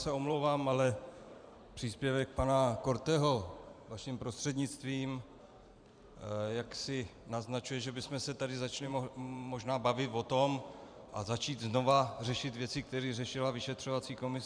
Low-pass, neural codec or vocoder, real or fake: 9.9 kHz; vocoder, 44.1 kHz, 128 mel bands every 512 samples, BigVGAN v2; fake